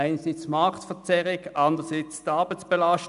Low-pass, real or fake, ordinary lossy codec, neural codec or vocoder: 10.8 kHz; real; none; none